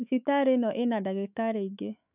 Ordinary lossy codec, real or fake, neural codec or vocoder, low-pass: none; fake; autoencoder, 48 kHz, 128 numbers a frame, DAC-VAE, trained on Japanese speech; 3.6 kHz